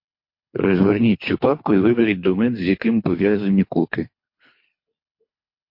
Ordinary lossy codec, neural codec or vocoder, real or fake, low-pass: MP3, 32 kbps; codec, 24 kHz, 3 kbps, HILCodec; fake; 5.4 kHz